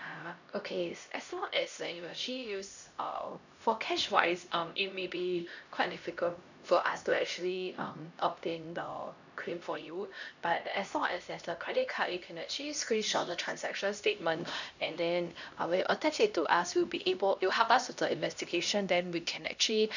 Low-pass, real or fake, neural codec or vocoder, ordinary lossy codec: 7.2 kHz; fake; codec, 16 kHz, 1 kbps, X-Codec, HuBERT features, trained on LibriSpeech; none